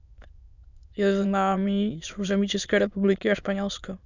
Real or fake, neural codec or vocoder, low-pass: fake; autoencoder, 22.05 kHz, a latent of 192 numbers a frame, VITS, trained on many speakers; 7.2 kHz